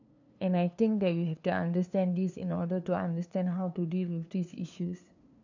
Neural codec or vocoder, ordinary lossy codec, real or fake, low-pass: codec, 16 kHz, 2 kbps, FunCodec, trained on LibriTTS, 25 frames a second; none; fake; 7.2 kHz